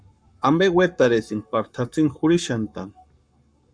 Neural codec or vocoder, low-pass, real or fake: codec, 44.1 kHz, 7.8 kbps, Pupu-Codec; 9.9 kHz; fake